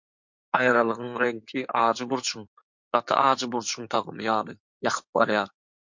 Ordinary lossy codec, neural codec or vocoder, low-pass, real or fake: MP3, 48 kbps; codec, 16 kHz in and 24 kHz out, 2.2 kbps, FireRedTTS-2 codec; 7.2 kHz; fake